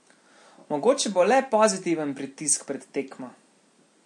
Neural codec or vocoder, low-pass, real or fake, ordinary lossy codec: none; 10.8 kHz; real; MP3, 48 kbps